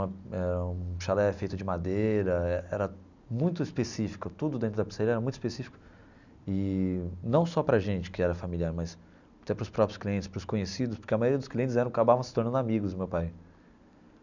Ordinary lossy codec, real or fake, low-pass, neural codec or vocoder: none; real; 7.2 kHz; none